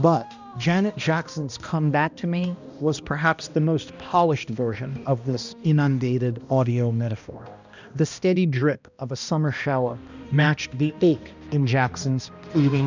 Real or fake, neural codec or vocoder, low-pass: fake; codec, 16 kHz, 1 kbps, X-Codec, HuBERT features, trained on balanced general audio; 7.2 kHz